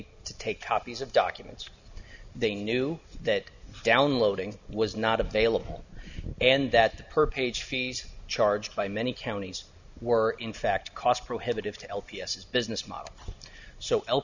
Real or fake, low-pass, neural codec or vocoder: real; 7.2 kHz; none